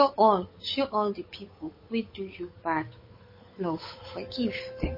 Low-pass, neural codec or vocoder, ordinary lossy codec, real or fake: 5.4 kHz; codec, 16 kHz, 8 kbps, FunCodec, trained on Chinese and English, 25 frames a second; MP3, 24 kbps; fake